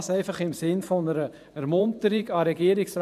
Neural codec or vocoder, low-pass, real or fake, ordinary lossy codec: vocoder, 44.1 kHz, 128 mel bands every 512 samples, BigVGAN v2; 14.4 kHz; fake; none